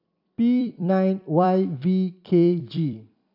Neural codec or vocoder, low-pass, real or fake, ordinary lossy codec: vocoder, 44.1 kHz, 128 mel bands every 256 samples, BigVGAN v2; 5.4 kHz; fake; none